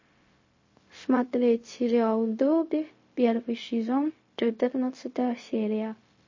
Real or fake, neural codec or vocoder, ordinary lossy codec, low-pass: fake; codec, 16 kHz, 0.4 kbps, LongCat-Audio-Codec; MP3, 32 kbps; 7.2 kHz